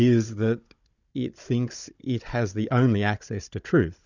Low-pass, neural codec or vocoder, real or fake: 7.2 kHz; codec, 16 kHz, 16 kbps, FunCodec, trained on Chinese and English, 50 frames a second; fake